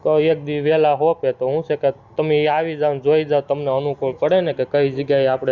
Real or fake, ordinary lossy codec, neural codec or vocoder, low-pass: real; Opus, 64 kbps; none; 7.2 kHz